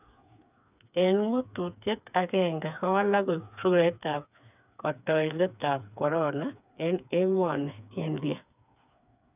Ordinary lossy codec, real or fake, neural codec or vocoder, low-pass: none; fake; codec, 16 kHz, 4 kbps, FreqCodec, smaller model; 3.6 kHz